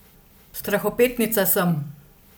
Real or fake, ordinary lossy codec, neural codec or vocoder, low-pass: real; none; none; none